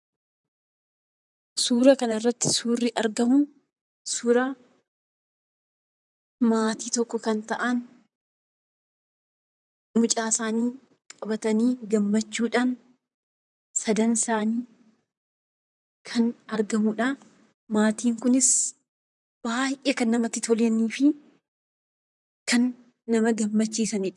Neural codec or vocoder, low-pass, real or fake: vocoder, 44.1 kHz, 128 mel bands, Pupu-Vocoder; 10.8 kHz; fake